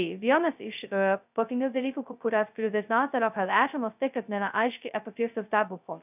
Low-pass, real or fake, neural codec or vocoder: 3.6 kHz; fake; codec, 16 kHz, 0.2 kbps, FocalCodec